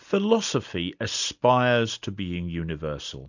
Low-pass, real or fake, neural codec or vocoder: 7.2 kHz; real; none